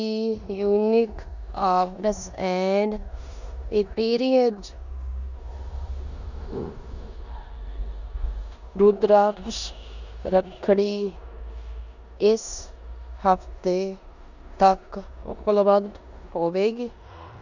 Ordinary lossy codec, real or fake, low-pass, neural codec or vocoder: none; fake; 7.2 kHz; codec, 16 kHz in and 24 kHz out, 0.9 kbps, LongCat-Audio-Codec, four codebook decoder